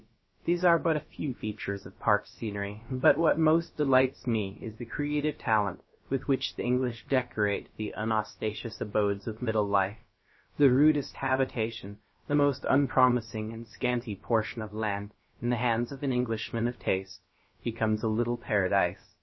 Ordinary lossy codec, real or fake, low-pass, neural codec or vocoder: MP3, 24 kbps; fake; 7.2 kHz; codec, 16 kHz, about 1 kbps, DyCAST, with the encoder's durations